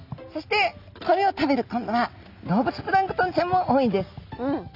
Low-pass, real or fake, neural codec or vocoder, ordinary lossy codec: 5.4 kHz; real; none; none